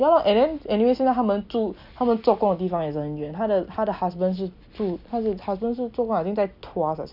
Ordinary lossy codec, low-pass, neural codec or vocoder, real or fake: none; 5.4 kHz; none; real